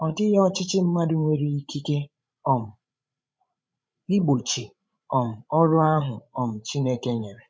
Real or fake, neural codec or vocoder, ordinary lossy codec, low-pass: fake; codec, 16 kHz, 16 kbps, FreqCodec, larger model; none; none